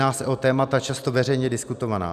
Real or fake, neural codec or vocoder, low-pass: real; none; 14.4 kHz